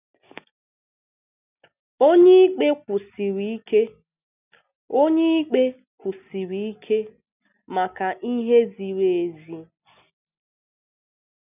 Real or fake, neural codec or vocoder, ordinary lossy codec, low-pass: real; none; none; 3.6 kHz